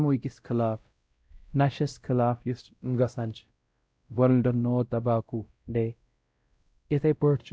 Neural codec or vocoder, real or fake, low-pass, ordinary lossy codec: codec, 16 kHz, 0.5 kbps, X-Codec, WavLM features, trained on Multilingual LibriSpeech; fake; none; none